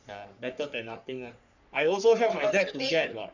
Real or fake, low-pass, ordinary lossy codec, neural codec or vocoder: fake; 7.2 kHz; none; codec, 44.1 kHz, 3.4 kbps, Pupu-Codec